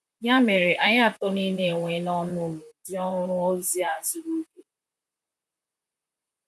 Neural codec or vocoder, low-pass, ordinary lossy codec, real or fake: vocoder, 44.1 kHz, 128 mel bands, Pupu-Vocoder; 14.4 kHz; none; fake